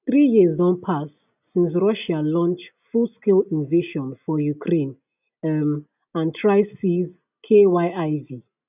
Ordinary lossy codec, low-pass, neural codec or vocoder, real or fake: none; 3.6 kHz; none; real